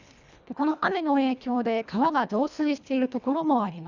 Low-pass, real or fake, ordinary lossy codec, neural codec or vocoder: 7.2 kHz; fake; none; codec, 24 kHz, 1.5 kbps, HILCodec